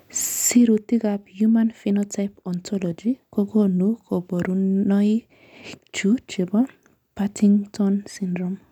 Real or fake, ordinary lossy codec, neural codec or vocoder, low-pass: real; none; none; 19.8 kHz